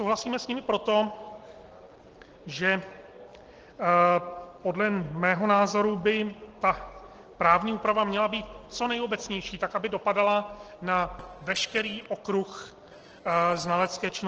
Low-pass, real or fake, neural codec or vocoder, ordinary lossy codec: 7.2 kHz; real; none; Opus, 16 kbps